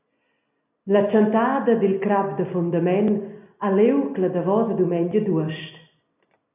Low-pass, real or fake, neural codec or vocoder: 3.6 kHz; real; none